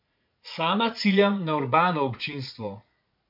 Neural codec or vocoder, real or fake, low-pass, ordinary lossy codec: vocoder, 44.1 kHz, 80 mel bands, Vocos; fake; 5.4 kHz; MP3, 48 kbps